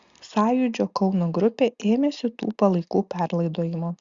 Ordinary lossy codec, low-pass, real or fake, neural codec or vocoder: Opus, 64 kbps; 7.2 kHz; real; none